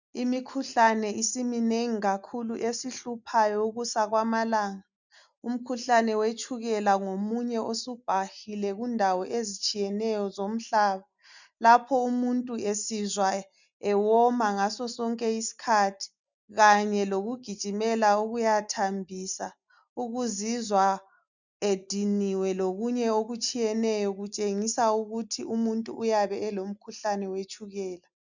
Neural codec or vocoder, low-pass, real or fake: none; 7.2 kHz; real